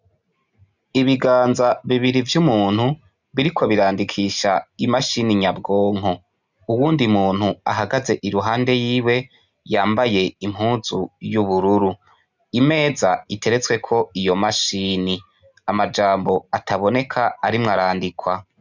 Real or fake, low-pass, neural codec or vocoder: real; 7.2 kHz; none